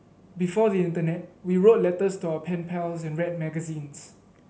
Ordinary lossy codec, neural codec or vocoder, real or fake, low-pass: none; none; real; none